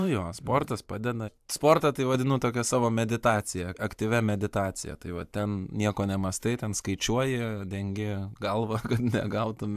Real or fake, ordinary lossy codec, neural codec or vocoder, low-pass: fake; AAC, 96 kbps; vocoder, 44.1 kHz, 128 mel bands every 512 samples, BigVGAN v2; 14.4 kHz